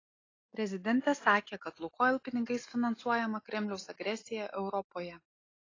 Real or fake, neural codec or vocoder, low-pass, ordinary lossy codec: real; none; 7.2 kHz; AAC, 32 kbps